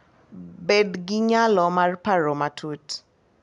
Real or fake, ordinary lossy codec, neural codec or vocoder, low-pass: real; none; none; 10.8 kHz